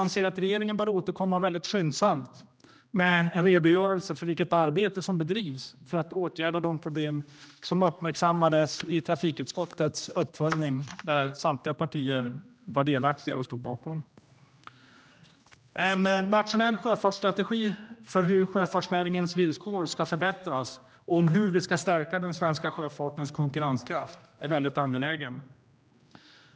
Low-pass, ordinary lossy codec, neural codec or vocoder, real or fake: none; none; codec, 16 kHz, 1 kbps, X-Codec, HuBERT features, trained on general audio; fake